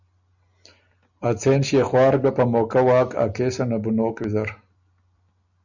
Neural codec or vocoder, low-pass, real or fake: none; 7.2 kHz; real